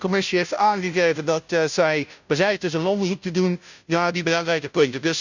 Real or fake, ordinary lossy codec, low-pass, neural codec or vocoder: fake; none; 7.2 kHz; codec, 16 kHz, 0.5 kbps, FunCodec, trained on Chinese and English, 25 frames a second